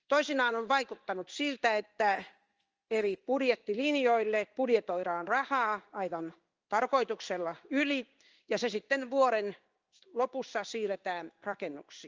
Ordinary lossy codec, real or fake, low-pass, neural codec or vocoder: Opus, 24 kbps; fake; 7.2 kHz; codec, 16 kHz in and 24 kHz out, 1 kbps, XY-Tokenizer